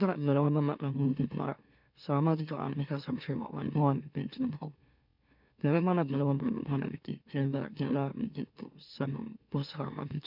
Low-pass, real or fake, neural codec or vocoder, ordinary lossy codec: 5.4 kHz; fake; autoencoder, 44.1 kHz, a latent of 192 numbers a frame, MeloTTS; none